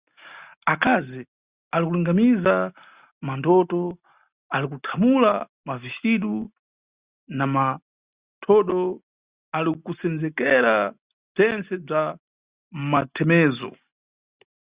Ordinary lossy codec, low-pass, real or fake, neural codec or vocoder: Opus, 64 kbps; 3.6 kHz; real; none